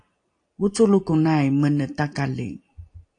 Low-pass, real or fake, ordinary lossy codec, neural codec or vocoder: 9.9 kHz; real; AAC, 48 kbps; none